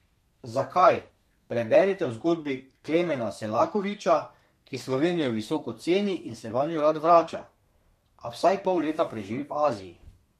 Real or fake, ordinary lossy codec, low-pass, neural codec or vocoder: fake; MP3, 64 kbps; 14.4 kHz; codec, 32 kHz, 1.9 kbps, SNAC